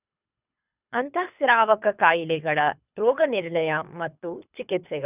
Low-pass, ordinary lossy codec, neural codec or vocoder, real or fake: 3.6 kHz; none; codec, 24 kHz, 3 kbps, HILCodec; fake